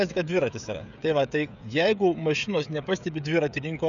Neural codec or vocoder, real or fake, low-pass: codec, 16 kHz, 16 kbps, FreqCodec, smaller model; fake; 7.2 kHz